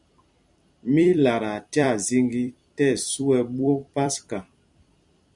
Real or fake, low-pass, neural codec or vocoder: fake; 10.8 kHz; vocoder, 44.1 kHz, 128 mel bands every 256 samples, BigVGAN v2